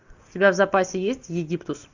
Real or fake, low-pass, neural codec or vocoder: real; 7.2 kHz; none